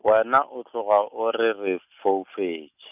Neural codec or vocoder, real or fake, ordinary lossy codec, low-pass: none; real; none; 3.6 kHz